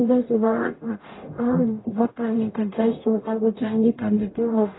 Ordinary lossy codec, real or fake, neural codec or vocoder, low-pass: AAC, 16 kbps; fake; codec, 44.1 kHz, 0.9 kbps, DAC; 7.2 kHz